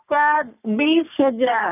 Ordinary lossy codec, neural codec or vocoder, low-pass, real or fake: none; codec, 44.1 kHz, 3.4 kbps, Pupu-Codec; 3.6 kHz; fake